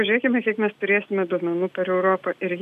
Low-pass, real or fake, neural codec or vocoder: 14.4 kHz; real; none